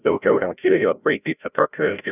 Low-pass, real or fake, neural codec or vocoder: 3.6 kHz; fake; codec, 16 kHz, 0.5 kbps, FreqCodec, larger model